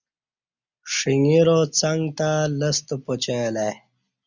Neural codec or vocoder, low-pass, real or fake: none; 7.2 kHz; real